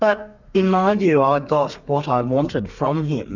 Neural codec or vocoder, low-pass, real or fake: codec, 32 kHz, 1.9 kbps, SNAC; 7.2 kHz; fake